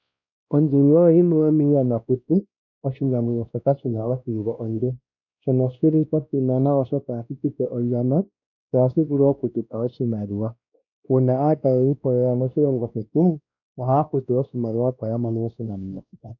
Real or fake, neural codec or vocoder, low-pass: fake; codec, 16 kHz, 1 kbps, X-Codec, WavLM features, trained on Multilingual LibriSpeech; 7.2 kHz